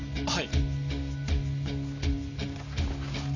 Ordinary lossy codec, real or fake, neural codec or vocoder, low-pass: none; real; none; 7.2 kHz